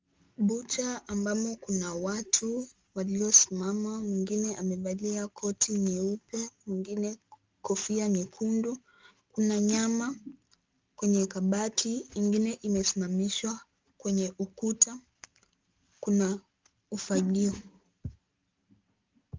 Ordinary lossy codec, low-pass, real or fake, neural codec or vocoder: Opus, 32 kbps; 7.2 kHz; real; none